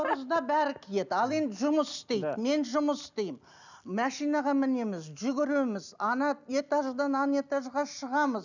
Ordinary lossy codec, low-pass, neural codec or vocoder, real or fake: none; 7.2 kHz; none; real